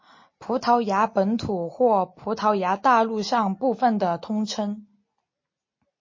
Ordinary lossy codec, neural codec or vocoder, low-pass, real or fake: MP3, 32 kbps; none; 7.2 kHz; real